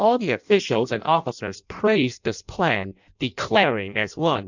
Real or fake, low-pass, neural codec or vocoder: fake; 7.2 kHz; codec, 16 kHz in and 24 kHz out, 0.6 kbps, FireRedTTS-2 codec